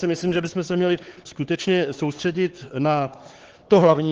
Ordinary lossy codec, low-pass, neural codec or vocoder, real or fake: Opus, 16 kbps; 7.2 kHz; codec, 16 kHz, 4 kbps, X-Codec, HuBERT features, trained on LibriSpeech; fake